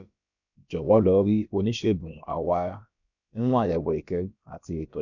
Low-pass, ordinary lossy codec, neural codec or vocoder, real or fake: 7.2 kHz; none; codec, 16 kHz, about 1 kbps, DyCAST, with the encoder's durations; fake